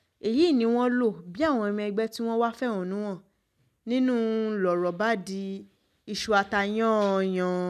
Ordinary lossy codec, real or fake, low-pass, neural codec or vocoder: none; real; 14.4 kHz; none